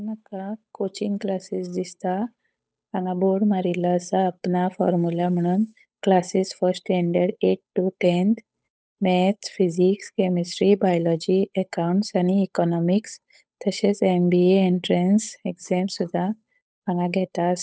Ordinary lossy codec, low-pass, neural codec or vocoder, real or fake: none; none; codec, 16 kHz, 8 kbps, FunCodec, trained on Chinese and English, 25 frames a second; fake